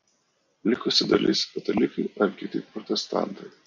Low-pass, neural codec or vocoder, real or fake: 7.2 kHz; none; real